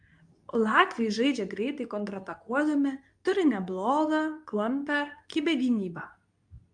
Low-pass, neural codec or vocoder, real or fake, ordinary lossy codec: 9.9 kHz; codec, 24 kHz, 0.9 kbps, WavTokenizer, medium speech release version 2; fake; AAC, 64 kbps